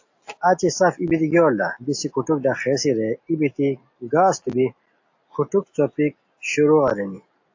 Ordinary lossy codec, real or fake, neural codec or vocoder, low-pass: AAC, 48 kbps; real; none; 7.2 kHz